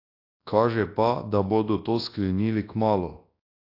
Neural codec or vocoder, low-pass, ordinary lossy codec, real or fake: codec, 24 kHz, 0.9 kbps, WavTokenizer, large speech release; 5.4 kHz; AAC, 32 kbps; fake